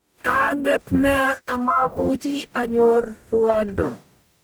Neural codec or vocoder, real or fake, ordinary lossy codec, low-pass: codec, 44.1 kHz, 0.9 kbps, DAC; fake; none; none